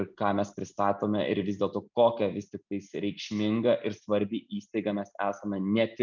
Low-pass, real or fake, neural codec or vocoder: 7.2 kHz; real; none